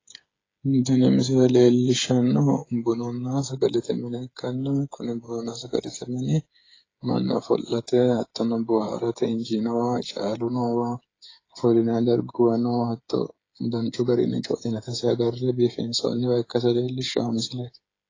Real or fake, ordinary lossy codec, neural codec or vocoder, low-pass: fake; AAC, 32 kbps; codec, 16 kHz, 8 kbps, FreqCodec, smaller model; 7.2 kHz